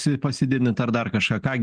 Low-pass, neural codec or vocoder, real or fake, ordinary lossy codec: 9.9 kHz; none; real; Opus, 24 kbps